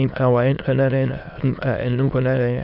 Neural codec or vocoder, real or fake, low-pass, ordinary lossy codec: autoencoder, 22.05 kHz, a latent of 192 numbers a frame, VITS, trained on many speakers; fake; 5.4 kHz; none